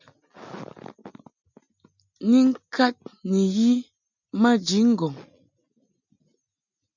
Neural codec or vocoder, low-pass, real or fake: none; 7.2 kHz; real